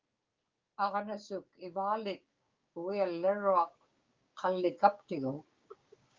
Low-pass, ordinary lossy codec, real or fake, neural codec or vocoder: 7.2 kHz; Opus, 32 kbps; fake; codec, 16 kHz, 6 kbps, DAC